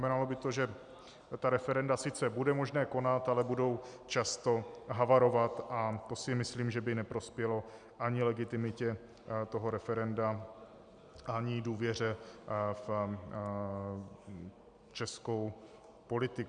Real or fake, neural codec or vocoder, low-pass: real; none; 9.9 kHz